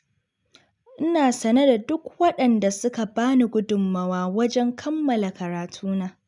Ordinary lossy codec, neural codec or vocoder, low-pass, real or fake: none; none; 10.8 kHz; real